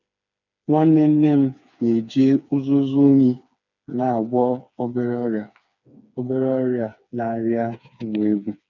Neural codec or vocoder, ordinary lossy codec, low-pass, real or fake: codec, 16 kHz, 4 kbps, FreqCodec, smaller model; none; 7.2 kHz; fake